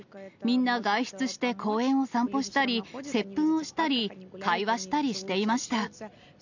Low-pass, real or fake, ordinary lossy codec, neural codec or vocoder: 7.2 kHz; real; none; none